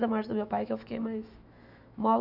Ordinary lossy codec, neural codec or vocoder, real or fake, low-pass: none; autoencoder, 48 kHz, 128 numbers a frame, DAC-VAE, trained on Japanese speech; fake; 5.4 kHz